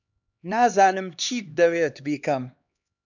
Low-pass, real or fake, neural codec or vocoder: 7.2 kHz; fake; codec, 16 kHz, 2 kbps, X-Codec, HuBERT features, trained on LibriSpeech